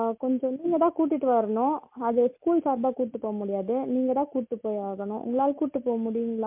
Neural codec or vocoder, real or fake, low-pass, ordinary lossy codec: none; real; 3.6 kHz; MP3, 32 kbps